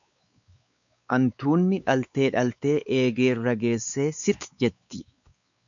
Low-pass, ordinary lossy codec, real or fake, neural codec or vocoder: 7.2 kHz; MP3, 96 kbps; fake; codec, 16 kHz, 4 kbps, X-Codec, WavLM features, trained on Multilingual LibriSpeech